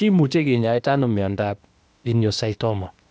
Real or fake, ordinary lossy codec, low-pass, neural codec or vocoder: fake; none; none; codec, 16 kHz, 0.8 kbps, ZipCodec